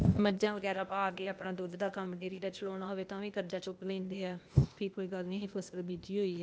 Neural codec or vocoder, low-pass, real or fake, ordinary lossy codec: codec, 16 kHz, 0.8 kbps, ZipCodec; none; fake; none